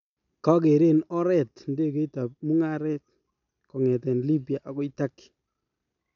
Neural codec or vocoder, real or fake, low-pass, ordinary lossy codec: none; real; 7.2 kHz; none